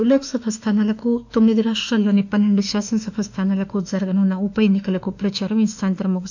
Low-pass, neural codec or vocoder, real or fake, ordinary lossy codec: 7.2 kHz; autoencoder, 48 kHz, 32 numbers a frame, DAC-VAE, trained on Japanese speech; fake; none